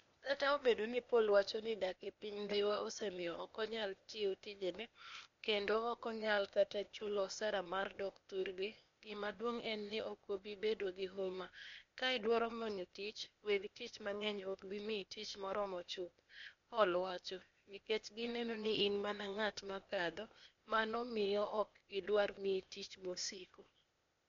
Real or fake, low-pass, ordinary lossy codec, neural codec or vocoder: fake; 7.2 kHz; MP3, 48 kbps; codec, 16 kHz, 0.8 kbps, ZipCodec